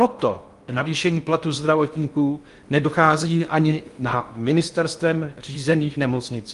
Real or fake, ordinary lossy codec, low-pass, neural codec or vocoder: fake; Opus, 32 kbps; 10.8 kHz; codec, 16 kHz in and 24 kHz out, 0.6 kbps, FocalCodec, streaming, 4096 codes